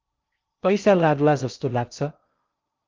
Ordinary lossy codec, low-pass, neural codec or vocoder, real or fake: Opus, 24 kbps; 7.2 kHz; codec, 16 kHz in and 24 kHz out, 0.6 kbps, FocalCodec, streaming, 2048 codes; fake